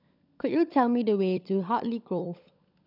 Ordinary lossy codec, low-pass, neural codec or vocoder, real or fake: none; 5.4 kHz; codec, 16 kHz, 16 kbps, FunCodec, trained on LibriTTS, 50 frames a second; fake